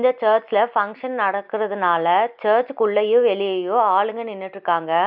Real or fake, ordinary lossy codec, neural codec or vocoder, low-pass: real; none; none; 5.4 kHz